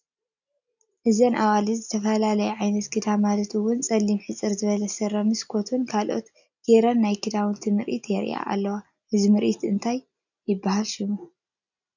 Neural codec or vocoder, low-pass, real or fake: none; 7.2 kHz; real